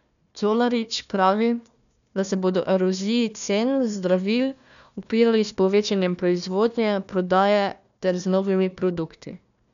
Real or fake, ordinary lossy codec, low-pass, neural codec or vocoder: fake; none; 7.2 kHz; codec, 16 kHz, 1 kbps, FunCodec, trained on Chinese and English, 50 frames a second